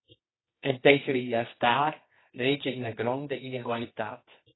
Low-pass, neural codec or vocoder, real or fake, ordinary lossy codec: 7.2 kHz; codec, 24 kHz, 0.9 kbps, WavTokenizer, medium music audio release; fake; AAC, 16 kbps